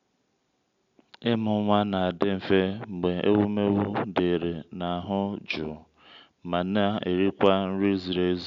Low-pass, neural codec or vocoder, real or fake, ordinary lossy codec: 7.2 kHz; none; real; none